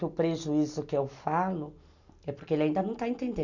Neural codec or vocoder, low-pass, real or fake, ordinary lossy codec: none; 7.2 kHz; real; none